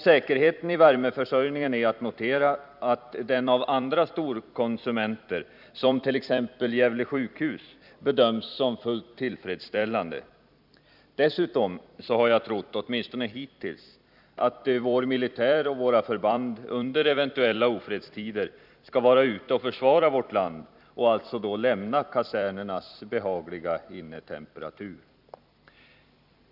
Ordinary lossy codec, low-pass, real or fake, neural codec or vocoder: none; 5.4 kHz; fake; vocoder, 44.1 kHz, 128 mel bands every 256 samples, BigVGAN v2